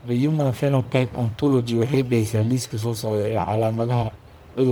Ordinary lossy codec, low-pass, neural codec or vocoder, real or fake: none; none; codec, 44.1 kHz, 1.7 kbps, Pupu-Codec; fake